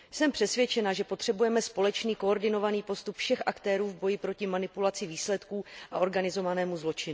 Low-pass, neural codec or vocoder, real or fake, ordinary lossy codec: none; none; real; none